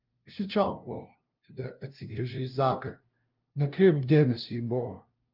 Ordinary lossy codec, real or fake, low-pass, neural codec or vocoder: Opus, 24 kbps; fake; 5.4 kHz; codec, 16 kHz, 0.5 kbps, FunCodec, trained on LibriTTS, 25 frames a second